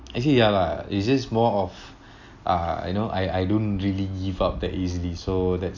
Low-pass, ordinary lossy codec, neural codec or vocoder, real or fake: 7.2 kHz; AAC, 48 kbps; none; real